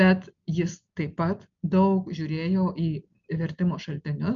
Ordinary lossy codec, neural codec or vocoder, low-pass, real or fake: MP3, 96 kbps; none; 7.2 kHz; real